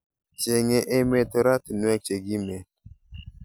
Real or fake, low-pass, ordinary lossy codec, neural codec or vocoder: real; none; none; none